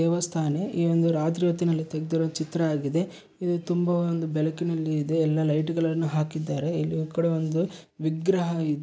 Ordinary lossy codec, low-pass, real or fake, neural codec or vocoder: none; none; real; none